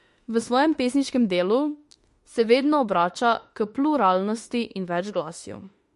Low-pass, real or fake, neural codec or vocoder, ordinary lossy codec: 14.4 kHz; fake; autoencoder, 48 kHz, 32 numbers a frame, DAC-VAE, trained on Japanese speech; MP3, 48 kbps